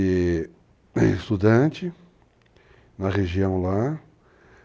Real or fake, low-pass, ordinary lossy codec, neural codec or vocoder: real; none; none; none